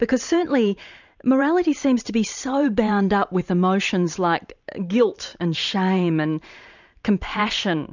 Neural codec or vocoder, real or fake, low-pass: vocoder, 44.1 kHz, 128 mel bands every 512 samples, BigVGAN v2; fake; 7.2 kHz